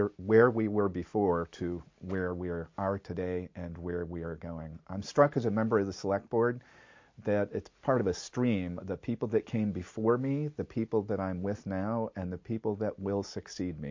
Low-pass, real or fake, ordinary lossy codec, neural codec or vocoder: 7.2 kHz; real; MP3, 48 kbps; none